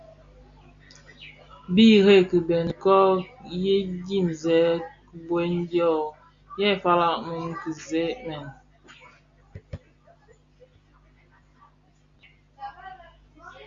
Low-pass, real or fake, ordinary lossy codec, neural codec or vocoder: 7.2 kHz; real; Opus, 64 kbps; none